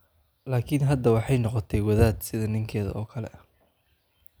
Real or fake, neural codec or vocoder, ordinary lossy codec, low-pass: real; none; none; none